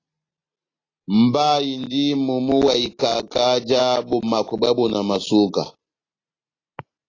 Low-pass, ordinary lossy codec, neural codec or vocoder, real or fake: 7.2 kHz; AAC, 32 kbps; none; real